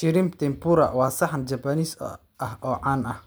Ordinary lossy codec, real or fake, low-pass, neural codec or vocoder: none; real; none; none